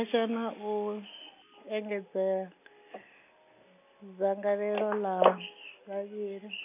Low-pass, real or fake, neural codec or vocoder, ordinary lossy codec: 3.6 kHz; real; none; none